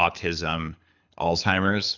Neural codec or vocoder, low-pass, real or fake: codec, 24 kHz, 6 kbps, HILCodec; 7.2 kHz; fake